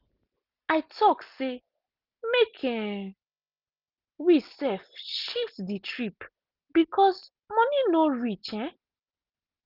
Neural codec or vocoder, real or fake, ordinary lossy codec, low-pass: none; real; Opus, 24 kbps; 5.4 kHz